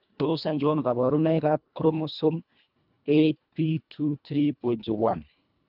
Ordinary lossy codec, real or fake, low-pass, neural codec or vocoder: none; fake; 5.4 kHz; codec, 24 kHz, 1.5 kbps, HILCodec